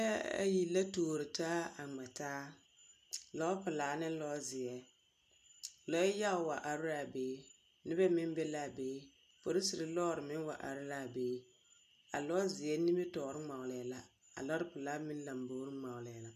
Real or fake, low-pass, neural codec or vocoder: real; 14.4 kHz; none